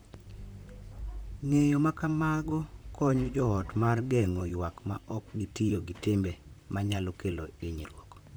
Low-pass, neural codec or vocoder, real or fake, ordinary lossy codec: none; vocoder, 44.1 kHz, 128 mel bands, Pupu-Vocoder; fake; none